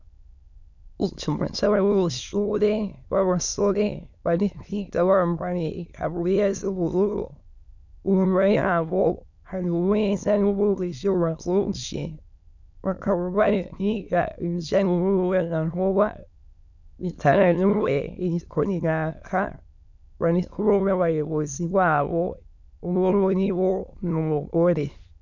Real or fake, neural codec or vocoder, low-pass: fake; autoencoder, 22.05 kHz, a latent of 192 numbers a frame, VITS, trained on many speakers; 7.2 kHz